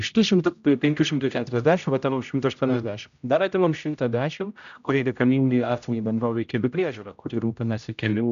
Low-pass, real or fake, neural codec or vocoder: 7.2 kHz; fake; codec, 16 kHz, 0.5 kbps, X-Codec, HuBERT features, trained on general audio